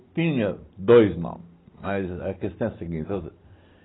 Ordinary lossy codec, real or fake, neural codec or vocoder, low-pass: AAC, 16 kbps; real; none; 7.2 kHz